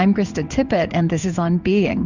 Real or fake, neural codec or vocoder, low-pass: fake; codec, 16 kHz in and 24 kHz out, 1 kbps, XY-Tokenizer; 7.2 kHz